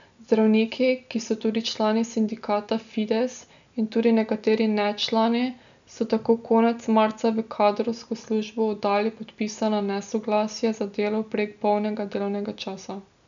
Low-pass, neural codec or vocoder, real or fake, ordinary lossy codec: 7.2 kHz; none; real; none